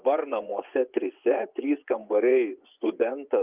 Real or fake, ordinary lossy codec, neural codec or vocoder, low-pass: fake; Opus, 24 kbps; vocoder, 24 kHz, 100 mel bands, Vocos; 3.6 kHz